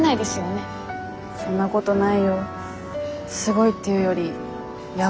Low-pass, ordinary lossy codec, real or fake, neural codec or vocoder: none; none; real; none